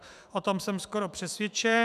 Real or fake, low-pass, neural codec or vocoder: fake; 14.4 kHz; autoencoder, 48 kHz, 128 numbers a frame, DAC-VAE, trained on Japanese speech